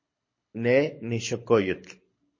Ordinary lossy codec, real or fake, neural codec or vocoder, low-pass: MP3, 32 kbps; fake; codec, 24 kHz, 6 kbps, HILCodec; 7.2 kHz